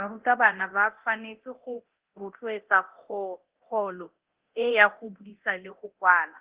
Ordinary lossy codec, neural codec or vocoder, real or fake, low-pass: Opus, 16 kbps; codec, 24 kHz, 0.9 kbps, DualCodec; fake; 3.6 kHz